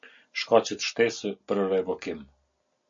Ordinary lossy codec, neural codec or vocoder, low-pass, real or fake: MP3, 48 kbps; none; 7.2 kHz; real